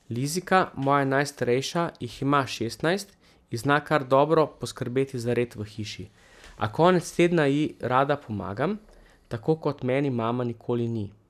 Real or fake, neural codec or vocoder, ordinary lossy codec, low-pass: real; none; none; 14.4 kHz